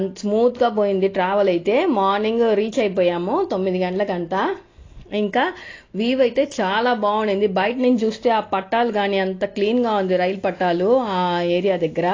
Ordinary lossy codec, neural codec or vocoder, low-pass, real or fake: AAC, 32 kbps; none; 7.2 kHz; real